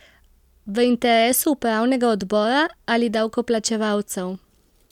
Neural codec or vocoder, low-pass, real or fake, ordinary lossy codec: none; 19.8 kHz; real; MP3, 96 kbps